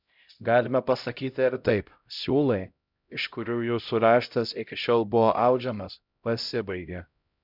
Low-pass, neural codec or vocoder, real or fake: 5.4 kHz; codec, 16 kHz, 0.5 kbps, X-Codec, HuBERT features, trained on LibriSpeech; fake